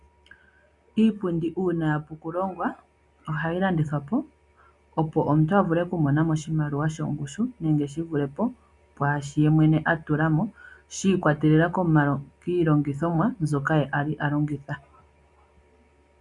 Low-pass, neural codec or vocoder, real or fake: 10.8 kHz; none; real